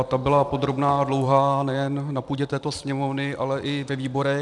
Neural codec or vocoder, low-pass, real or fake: vocoder, 44.1 kHz, 128 mel bands every 256 samples, BigVGAN v2; 10.8 kHz; fake